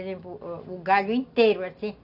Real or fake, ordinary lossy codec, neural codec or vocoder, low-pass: real; none; none; 5.4 kHz